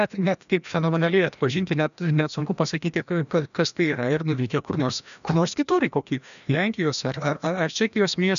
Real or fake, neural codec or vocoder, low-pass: fake; codec, 16 kHz, 1 kbps, FreqCodec, larger model; 7.2 kHz